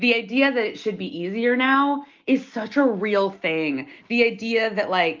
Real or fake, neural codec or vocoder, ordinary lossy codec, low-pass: real; none; Opus, 32 kbps; 7.2 kHz